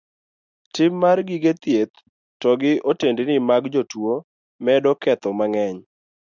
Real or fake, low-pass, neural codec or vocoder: real; 7.2 kHz; none